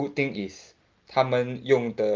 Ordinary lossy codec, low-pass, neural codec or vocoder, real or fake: Opus, 32 kbps; 7.2 kHz; none; real